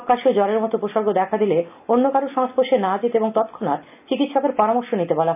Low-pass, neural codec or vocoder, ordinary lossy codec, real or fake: 3.6 kHz; none; none; real